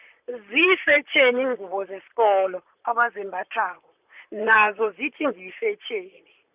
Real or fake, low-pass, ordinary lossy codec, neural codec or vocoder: fake; 3.6 kHz; Opus, 64 kbps; vocoder, 44.1 kHz, 128 mel bands, Pupu-Vocoder